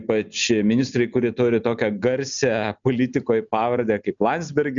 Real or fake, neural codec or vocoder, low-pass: real; none; 7.2 kHz